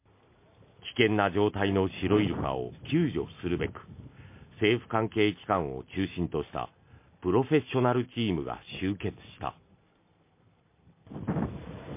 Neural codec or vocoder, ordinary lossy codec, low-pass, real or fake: none; MP3, 24 kbps; 3.6 kHz; real